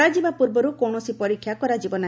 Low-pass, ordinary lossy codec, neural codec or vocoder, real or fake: none; none; none; real